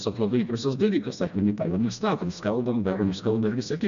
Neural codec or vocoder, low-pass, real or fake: codec, 16 kHz, 1 kbps, FreqCodec, smaller model; 7.2 kHz; fake